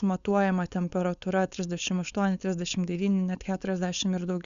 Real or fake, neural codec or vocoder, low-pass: fake; codec, 16 kHz, 4.8 kbps, FACodec; 7.2 kHz